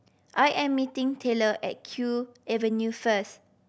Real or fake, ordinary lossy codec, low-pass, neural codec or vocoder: real; none; none; none